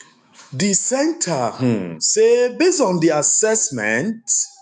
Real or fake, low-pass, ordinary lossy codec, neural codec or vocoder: fake; 10.8 kHz; none; autoencoder, 48 kHz, 128 numbers a frame, DAC-VAE, trained on Japanese speech